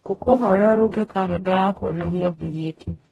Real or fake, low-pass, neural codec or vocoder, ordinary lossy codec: fake; 19.8 kHz; codec, 44.1 kHz, 0.9 kbps, DAC; AAC, 32 kbps